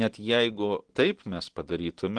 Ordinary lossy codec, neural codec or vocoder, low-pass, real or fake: Opus, 16 kbps; none; 9.9 kHz; real